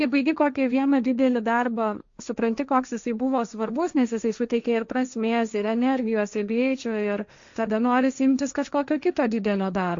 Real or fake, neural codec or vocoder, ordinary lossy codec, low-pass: fake; codec, 16 kHz, 1.1 kbps, Voila-Tokenizer; Opus, 64 kbps; 7.2 kHz